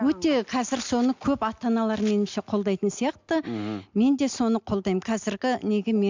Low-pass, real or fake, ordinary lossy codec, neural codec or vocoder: 7.2 kHz; real; MP3, 64 kbps; none